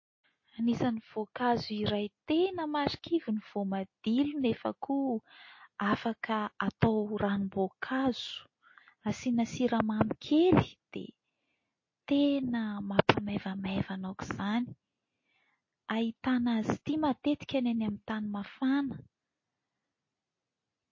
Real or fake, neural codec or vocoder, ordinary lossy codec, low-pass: real; none; MP3, 32 kbps; 7.2 kHz